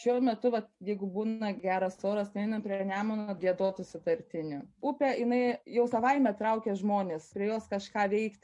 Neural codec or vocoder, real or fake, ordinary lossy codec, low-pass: none; real; MP3, 48 kbps; 10.8 kHz